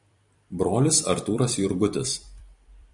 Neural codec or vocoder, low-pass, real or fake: vocoder, 44.1 kHz, 128 mel bands every 256 samples, BigVGAN v2; 10.8 kHz; fake